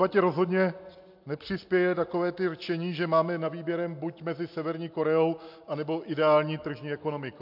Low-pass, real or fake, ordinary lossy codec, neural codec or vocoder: 5.4 kHz; real; MP3, 48 kbps; none